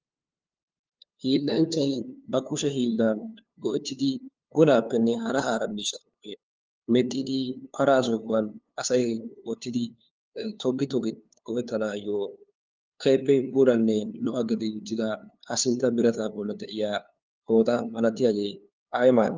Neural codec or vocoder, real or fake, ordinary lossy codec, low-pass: codec, 16 kHz, 2 kbps, FunCodec, trained on LibriTTS, 25 frames a second; fake; Opus, 24 kbps; 7.2 kHz